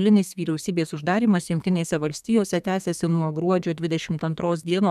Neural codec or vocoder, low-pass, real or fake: codec, 32 kHz, 1.9 kbps, SNAC; 14.4 kHz; fake